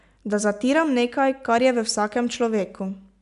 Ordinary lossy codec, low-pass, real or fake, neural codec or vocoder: none; 10.8 kHz; real; none